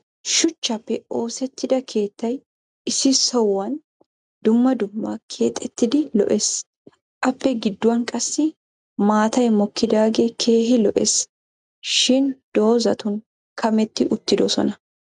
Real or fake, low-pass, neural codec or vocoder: real; 10.8 kHz; none